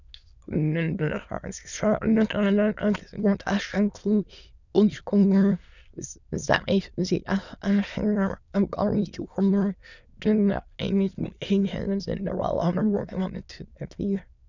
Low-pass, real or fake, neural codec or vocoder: 7.2 kHz; fake; autoencoder, 22.05 kHz, a latent of 192 numbers a frame, VITS, trained on many speakers